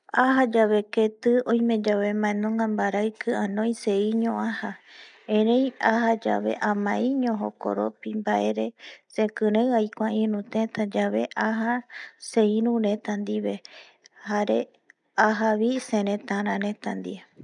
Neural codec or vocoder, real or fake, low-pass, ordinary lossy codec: none; real; 9.9 kHz; none